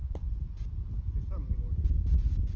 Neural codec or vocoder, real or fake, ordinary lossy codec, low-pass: none; real; Opus, 16 kbps; 7.2 kHz